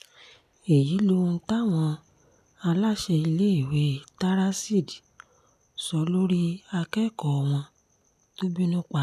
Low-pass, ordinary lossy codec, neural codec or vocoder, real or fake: 14.4 kHz; none; none; real